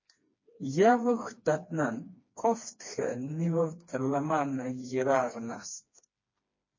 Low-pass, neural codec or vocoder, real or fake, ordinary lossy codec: 7.2 kHz; codec, 16 kHz, 2 kbps, FreqCodec, smaller model; fake; MP3, 32 kbps